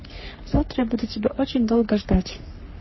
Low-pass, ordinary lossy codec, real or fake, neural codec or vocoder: 7.2 kHz; MP3, 24 kbps; fake; codec, 44.1 kHz, 3.4 kbps, Pupu-Codec